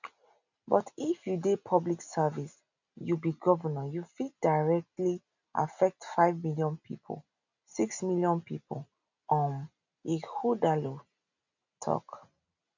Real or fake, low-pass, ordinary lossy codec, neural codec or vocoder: real; 7.2 kHz; none; none